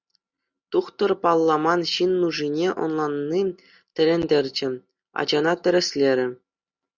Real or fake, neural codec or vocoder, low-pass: real; none; 7.2 kHz